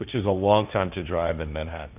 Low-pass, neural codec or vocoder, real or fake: 3.6 kHz; codec, 16 kHz, 1.1 kbps, Voila-Tokenizer; fake